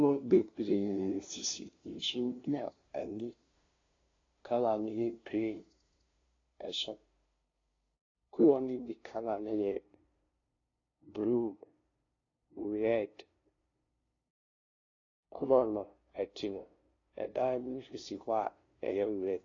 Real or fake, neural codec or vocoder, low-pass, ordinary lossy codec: fake; codec, 16 kHz, 0.5 kbps, FunCodec, trained on LibriTTS, 25 frames a second; 7.2 kHz; AAC, 32 kbps